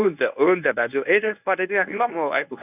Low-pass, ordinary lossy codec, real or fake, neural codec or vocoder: 3.6 kHz; none; fake; codec, 24 kHz, 0.9 kbps, WavTokenizer, medium speech release version 1